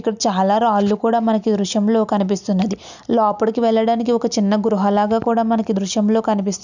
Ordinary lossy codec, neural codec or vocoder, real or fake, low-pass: none; none; real; 7.2 kHz